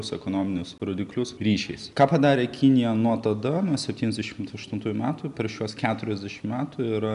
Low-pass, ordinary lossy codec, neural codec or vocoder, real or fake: 10.8 kHz; MP3, 96 kbps; none; real